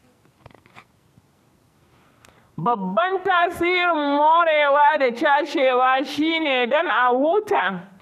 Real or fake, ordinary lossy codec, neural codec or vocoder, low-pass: fake; none; codec, 44.1 kHz, 2.6 kbps, SNAC; 14.4 kHz